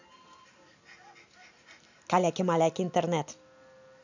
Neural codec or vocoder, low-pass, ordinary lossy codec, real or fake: none; 7.2 kHz; none; real